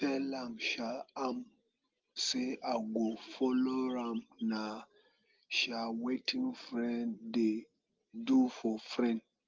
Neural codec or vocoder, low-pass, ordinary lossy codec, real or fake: none; 7.2 kHz; Opus, 32 kbps; real